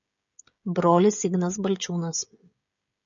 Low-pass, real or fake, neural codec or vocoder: 7.2 kHz; fake; codec, 16 kHz, 16 kbps, FreqCodec, smaller model